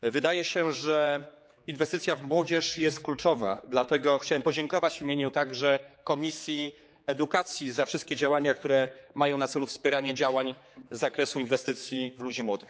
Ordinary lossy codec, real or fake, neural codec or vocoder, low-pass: none; fake; codec, 16 kHz, 4 kbps, X-Codec, HuBERT features, trained on general audio; none